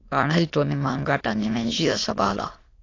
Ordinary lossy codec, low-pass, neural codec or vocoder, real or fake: AAC, 32 kbps; 7.2 kHz; autoencoder, 22.05 kHz, a latent of 192 numbers a frame, VITS, trained on many speakers; fake